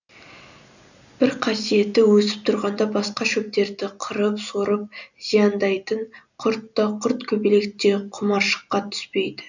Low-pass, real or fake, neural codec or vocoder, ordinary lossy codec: 7.2 kHz; real; none; none